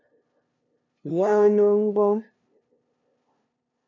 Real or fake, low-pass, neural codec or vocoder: fake; 7.2 kHz; codec, 16 kHz, 0.5 kbps, FunCodec, trained on LibriTTS, 25 frames a second